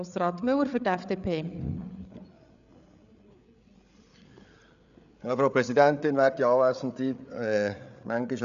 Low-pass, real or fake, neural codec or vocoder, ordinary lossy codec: 7.2 kHz; fake; codec, 16 kHz, 8 kbps, FreqCodec, larger model; none